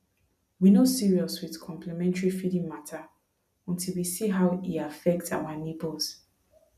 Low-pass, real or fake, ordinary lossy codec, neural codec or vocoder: 14.4 kHz; real; none; none